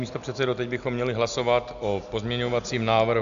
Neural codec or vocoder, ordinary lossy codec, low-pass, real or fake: none; AAC, 96 kbps; 7.2 kHz; real